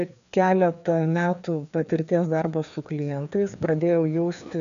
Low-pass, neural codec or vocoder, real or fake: 7.2 kHz; codec, 16 kHz, 2 kbps, FreqCodec, larger model; fake